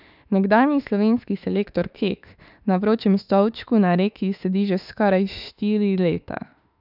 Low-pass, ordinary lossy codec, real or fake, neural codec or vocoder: 5.4 kHz; none; fake; autoencoder, 48 kHz, 32 numbers a frame, DAC-VAE, trained on Japanese speech